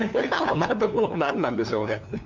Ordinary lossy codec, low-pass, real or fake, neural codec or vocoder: MP3, 64 kbps; 7.2 kHz; fake; codec, 16 kHz, 2 kbps, FunCodec, trained on LibriTTS, 25 frames a second